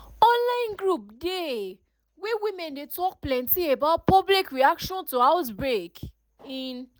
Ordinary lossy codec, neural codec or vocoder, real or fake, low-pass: none; none; real; none